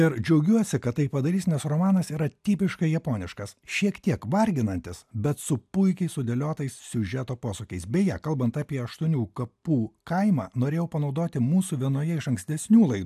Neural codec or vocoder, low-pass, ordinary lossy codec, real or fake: none; 14.4 kHz; AAC, 96 kbps; real